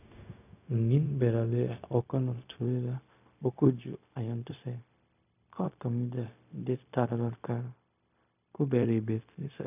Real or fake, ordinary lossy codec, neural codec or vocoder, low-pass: fake; none; codec, 16 kHz, 0.4 kbps, LongCat-Audio-Codec; 3.6 kHz